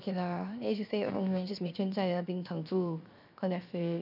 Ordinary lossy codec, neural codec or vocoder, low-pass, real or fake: none; codec, 16 kHz, 0.7 kbps, FocalCodec; 5.4 kHz; fake